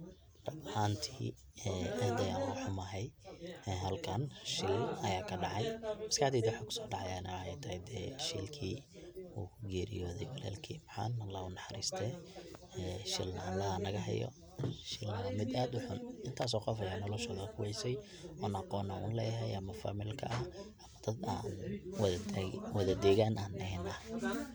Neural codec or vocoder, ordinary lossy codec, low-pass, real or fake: none; none; none; real